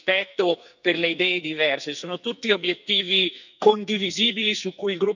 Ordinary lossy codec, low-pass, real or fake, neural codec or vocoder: none; 7.2 kHz; fake; codec, 44.1 kHz, 2.6 kbps, SNAC